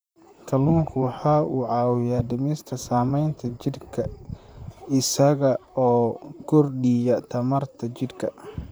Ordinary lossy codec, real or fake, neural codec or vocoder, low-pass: none; fake; vocoder, 44.1 kHz, 128 mel bands, Pupu-Vocoder; none